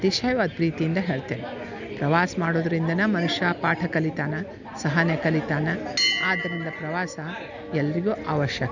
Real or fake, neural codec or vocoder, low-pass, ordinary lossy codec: real; none; 7.2 kHz; none